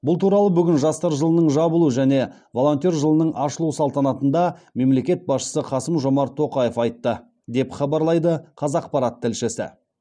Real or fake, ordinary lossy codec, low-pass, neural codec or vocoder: real; none; 9.9 kHz; none